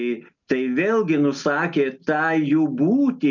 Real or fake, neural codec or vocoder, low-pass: real; none; 7.2 kHz